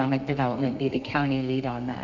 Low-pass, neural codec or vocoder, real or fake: 7.2 kHz; codec, 32 kHz, 1.9 kbps, SNAC; fake